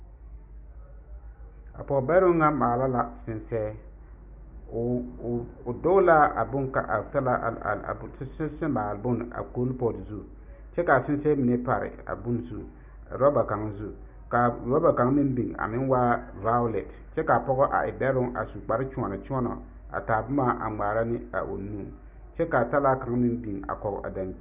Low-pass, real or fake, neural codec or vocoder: 3.6 kHz; real; none